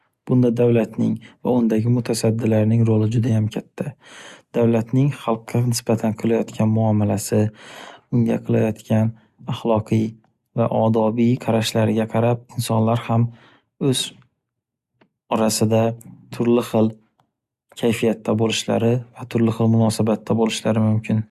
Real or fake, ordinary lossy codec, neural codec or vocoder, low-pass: real; Opus, 64 kbps; none; 14.4 kHz